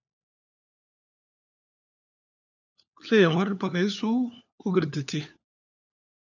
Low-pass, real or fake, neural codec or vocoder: 7.2 kHz; fake; codec, 16 kHz, 4 kbps, FunCodec, trained on LibriTTS, 50 frames a second